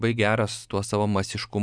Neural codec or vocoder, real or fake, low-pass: none; real; 9.9 kHz